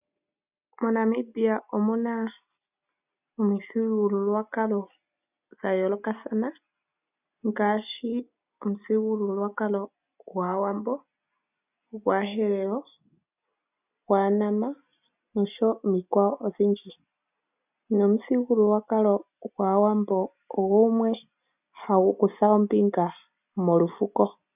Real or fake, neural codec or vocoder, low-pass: real; none; 3.6 kHz